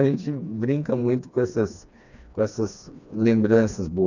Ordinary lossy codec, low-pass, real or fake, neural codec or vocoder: none; 7.2 kHz; fake; codec, 16 kHz, 2 kbps, FreqCodec, smaller model